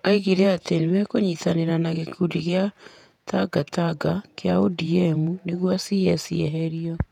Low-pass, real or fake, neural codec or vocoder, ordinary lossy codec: 19.8 kHz; fake; vocoder, 48 kHz, 128 mel bands, Vocos; none